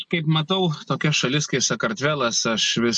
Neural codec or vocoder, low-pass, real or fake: none; 10.8 kHz; real